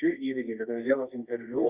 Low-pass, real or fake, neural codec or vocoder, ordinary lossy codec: 3.6 kHz; fake; codec, 24 kHz, 0.9 kbps, WavTokenizer, medium music audio release; Opus, 64 kbps